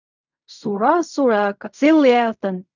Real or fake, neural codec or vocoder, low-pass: fake; codec, 16 kHz in and 24 kHz out, 0.4 kbps, LongCat-Audio-Codec, fine tuned four codebook decoder; 7.2 kHz